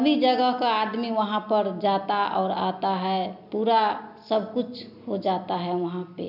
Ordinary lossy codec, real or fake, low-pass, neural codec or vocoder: none; real; 5.4 kHz; none